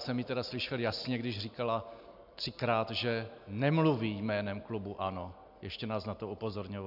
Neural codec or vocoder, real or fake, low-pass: none; real; 5.4 kHz